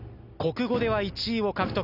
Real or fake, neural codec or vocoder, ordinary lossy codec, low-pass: real; none; none; 5.4 kHz